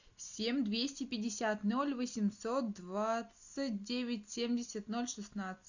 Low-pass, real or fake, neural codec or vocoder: 7.2 kHz; real; none